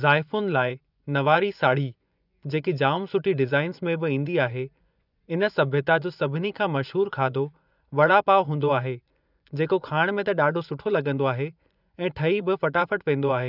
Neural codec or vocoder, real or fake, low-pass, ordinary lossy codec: vocoder, 24 kHz, 100 mel bands, Vocos; fake; 5.4 kHz; none